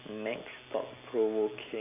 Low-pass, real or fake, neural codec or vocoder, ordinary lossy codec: 3.6 kHz; real; none; none